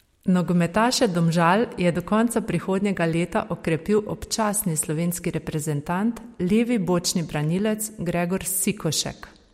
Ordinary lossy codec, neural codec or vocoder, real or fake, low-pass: MP3, 64 kbps; none; real; 19.8 kHz